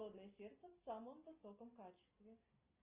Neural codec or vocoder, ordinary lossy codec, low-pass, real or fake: none; Opus, 24 kbps; 3.6 kHz; real